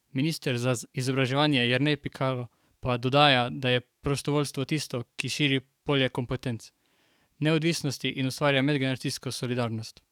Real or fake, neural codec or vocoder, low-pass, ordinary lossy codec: fake; codec, 44.1 kHz, 7.8 kbps, DAC; 19.8 kHz; none